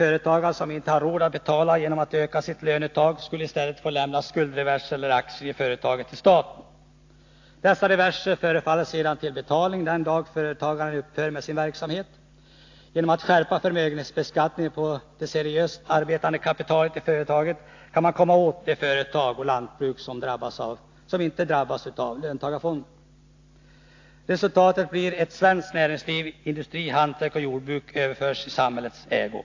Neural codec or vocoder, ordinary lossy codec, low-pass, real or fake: none; AAC, 48 kbps; 7.2 kHz; real